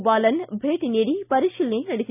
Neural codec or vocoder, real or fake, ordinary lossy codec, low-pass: none; real; none; 3.6 kHz